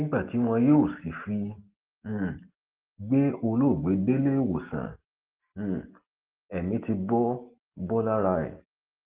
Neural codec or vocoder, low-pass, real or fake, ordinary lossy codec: none; 3.6 kHz; real; Opus, 16 kbps